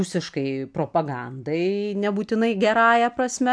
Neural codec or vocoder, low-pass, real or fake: none; 9.9 kHz; real